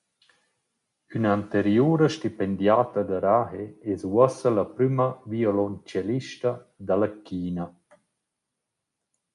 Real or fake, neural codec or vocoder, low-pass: real; none; 10.8 kHz